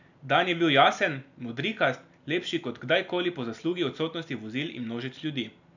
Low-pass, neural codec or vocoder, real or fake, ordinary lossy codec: 7.2 kHz; none; real; none